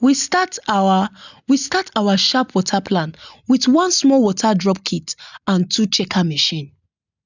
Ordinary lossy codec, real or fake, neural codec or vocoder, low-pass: none; real; none; 7.2 kHz